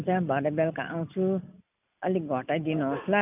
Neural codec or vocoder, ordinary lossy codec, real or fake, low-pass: none; none; real; 3.6 kHz